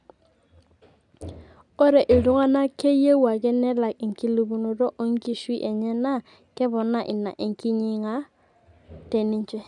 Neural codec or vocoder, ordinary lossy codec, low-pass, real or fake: none; none; 10.8 kHz; real